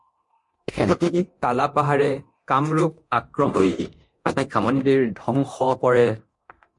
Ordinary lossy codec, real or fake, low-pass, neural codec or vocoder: MP3, 48 kbps; fake; 10.8 kHz; codec, 24 kHz, 0.9 kbps, DualCodec